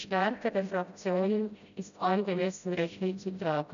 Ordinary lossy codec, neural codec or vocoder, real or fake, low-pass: none; codec, 16 kHz, 0.5 kbps, FreqCodec, smaller model; fake; 7.2 kHz